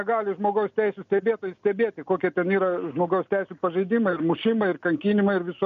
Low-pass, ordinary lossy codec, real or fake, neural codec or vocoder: 7.2 kHz; MP3, 64 kbps; real; none